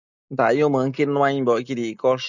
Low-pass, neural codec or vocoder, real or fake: 7.2 kHz; none; real